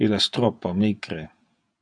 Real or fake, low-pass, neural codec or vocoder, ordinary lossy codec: fake; 9.9 kHz; vocoder, 48 kHz, 128 mel bands, Vocos; MP3, 64 kbps